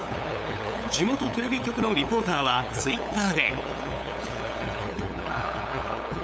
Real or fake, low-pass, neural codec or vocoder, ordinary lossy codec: fake; none; codec, 16 kHz, 8 kbps, FunCodec, trained on LibriTTS, 25 frames a second; none